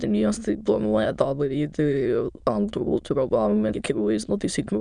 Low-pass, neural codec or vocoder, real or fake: 9.9 kHz; autoencoder, 22.05 kHz, a latent of 192 numbers a frame, VITS, trained on many speakers; fake